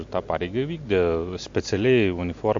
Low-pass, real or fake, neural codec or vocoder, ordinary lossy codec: 7.2 kHz; real; none; MP3, 48 kbps